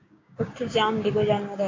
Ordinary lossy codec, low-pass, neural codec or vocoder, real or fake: AAC, 32 kbps; 7.2 kHz; codec, 16 kHz, 6 kbps, DAC; fake